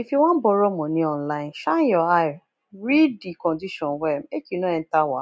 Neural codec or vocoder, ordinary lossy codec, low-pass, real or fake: none; none; none; real